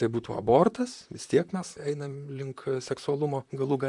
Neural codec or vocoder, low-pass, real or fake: vocoder, 44.1 kHz, 128 mel bands, Pupu-Vocoder; 10.8 kHz; fake